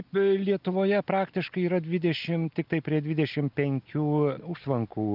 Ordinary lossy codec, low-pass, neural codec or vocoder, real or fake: Opus, 24 kbps; 5.4 kHz; none; real